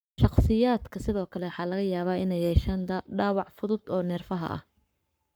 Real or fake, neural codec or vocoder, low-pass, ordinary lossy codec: fake; codec, 44.1 kHz, 7.8 kbps, Pupu-Codec; none; none